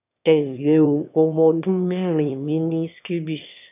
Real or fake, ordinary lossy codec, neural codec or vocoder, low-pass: fake; none; autoencoder, 22.05 kHz, a latent of 192 numbers a frame, VITS, trained on one speaker; 3.6 kHz